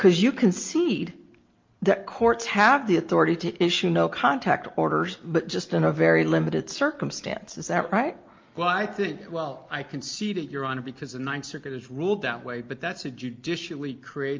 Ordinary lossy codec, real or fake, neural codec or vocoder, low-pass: Opus, 24 kbps; real; none; 7.2 kHz